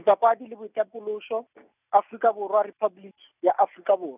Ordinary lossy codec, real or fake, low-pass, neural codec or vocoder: none; real; 3.6 kHz; none